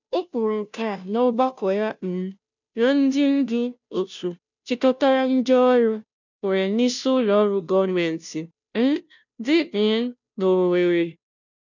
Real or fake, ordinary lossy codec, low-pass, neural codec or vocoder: fake; none; 7.2 kHz; codec, 16 kHz, 0.5 kbps, FunCodec, trained on Chinese and English, 25 frames a second